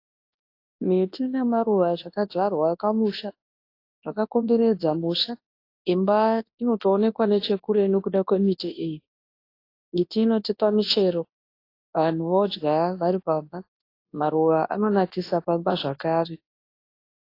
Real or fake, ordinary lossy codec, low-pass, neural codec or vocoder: fake; AAC, 32 kbps; 5.4 kHz; codec, 24 kHz, 0.9 kbps, WavTokenizer, large speech release